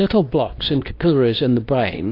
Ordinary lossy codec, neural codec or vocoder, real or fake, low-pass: AAC, 32 kbps; codec, 24 kHz, 0.9 kbps, WavTokenizer, medium speech release version 2; fake; 5.4 kHz